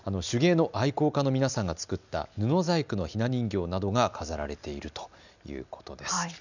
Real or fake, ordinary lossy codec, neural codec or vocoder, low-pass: real; none; none; 7.2 kHz